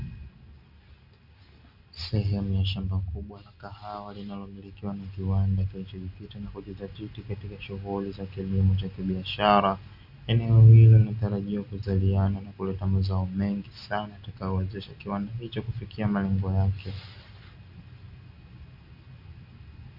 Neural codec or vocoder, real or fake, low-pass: none; real; 5.4 kHz